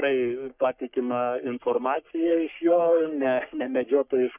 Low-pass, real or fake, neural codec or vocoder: 3.6 kHz; fake; codec, 44.1 kHz, 3.4 kbps, Pupu-Codec